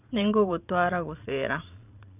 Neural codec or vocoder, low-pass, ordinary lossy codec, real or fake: vocoder, 22.05 kHz, 80 mel bands, Vocos; 3.6 kHz; none; fake